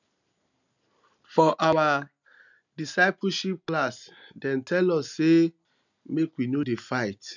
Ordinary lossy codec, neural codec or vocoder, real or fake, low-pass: none; none; real; 7.2 kHz